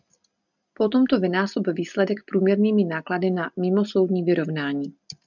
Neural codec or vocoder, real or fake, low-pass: none; real; 7.2 kHz